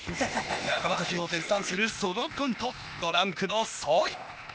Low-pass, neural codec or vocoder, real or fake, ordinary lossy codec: none; codec, 16 kHz, 0.8 kbps, ZipCodec; fake; none